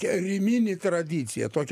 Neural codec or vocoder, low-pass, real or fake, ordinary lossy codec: none; 14.4 kHz; real; AAC, 96 kbps